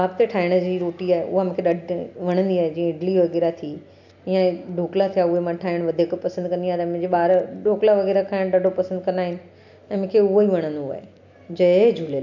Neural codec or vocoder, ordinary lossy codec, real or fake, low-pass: none; none; real; 7.2 kHz